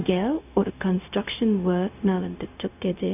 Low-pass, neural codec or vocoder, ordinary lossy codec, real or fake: 3.6 kHz; codec, 16 kHz, 0.4 kbps, LongCat-Audio-Codec; none; fake